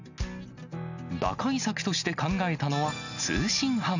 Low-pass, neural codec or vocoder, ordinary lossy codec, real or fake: 7.2 kHz; none; none; real